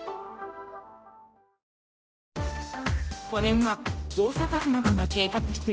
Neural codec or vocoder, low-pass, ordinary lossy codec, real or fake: codec, 16 kHz, 0.5 kbps, X-Codec, HuBERT features, trained on general audio; none; none; fake